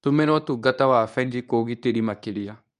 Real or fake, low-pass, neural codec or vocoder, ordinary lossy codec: fake; 10.8 kHz; codec, 24 kHz, 0.9 kbps, WavTokenizer, medium speech release version 2; none